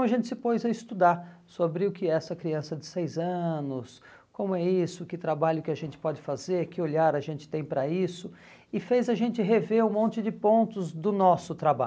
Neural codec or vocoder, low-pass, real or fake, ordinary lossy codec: none; none; real; none